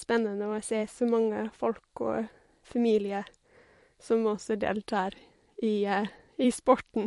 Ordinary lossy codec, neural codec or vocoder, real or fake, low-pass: MP3, 48 kbps; vocoder, 44.1 kHz, 128 mel bands every 256 samples, BigVGAN v2; fake; 14.4 kHz